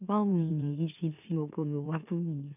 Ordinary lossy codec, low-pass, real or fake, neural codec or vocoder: none; 3.6 kHz; fake; autoencoder, 44.1 kHz, a latent of 192 numbers a frame, MeloTTS